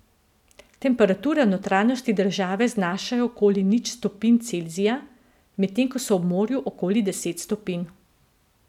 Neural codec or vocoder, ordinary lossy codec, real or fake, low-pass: none; none; real; 19.8 kHz